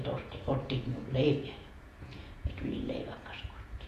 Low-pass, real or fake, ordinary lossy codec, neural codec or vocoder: 14.4 kHz; fake; AAC, 48 kbps; vocoder, 48 kHz, 128 mel bands, Vocos